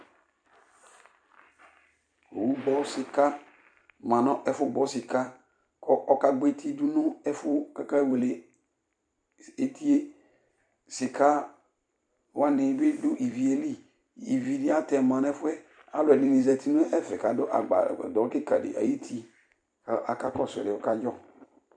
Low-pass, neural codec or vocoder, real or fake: 9.9 kHz; vocoder, 44.1 kHz, 128 mel bands every 256 samples, BigVGAN v2; fake